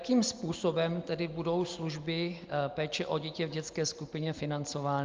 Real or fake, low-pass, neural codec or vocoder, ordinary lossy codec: real; 7.2 kHz; none; Opus, 32 kbps